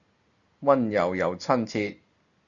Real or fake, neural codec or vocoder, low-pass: real; none; 7.2 kHz